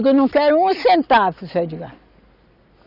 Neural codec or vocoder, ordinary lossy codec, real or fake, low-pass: none; none; real; 5.4 kHz